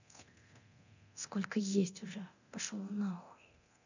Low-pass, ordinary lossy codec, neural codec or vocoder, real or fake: 7.2 kHz; none; codec, 24 kHz, 0.9 kbps, DualCodec; fake